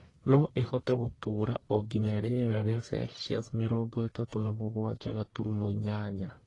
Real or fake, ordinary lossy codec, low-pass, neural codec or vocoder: fake; AAC, 32 kbps; 10.8 kHz; codec, 44.1 kHz, 1.7 kbps, Pupu-Codec